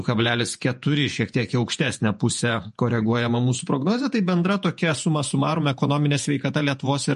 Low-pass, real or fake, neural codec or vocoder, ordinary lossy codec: 14.4 kHz; fake; vocoder, 48 kHz, 128 mel bands, Vocos; MP3, 48 kbps